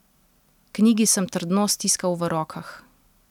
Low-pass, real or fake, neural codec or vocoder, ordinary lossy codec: 19.8 kHz; real; none; none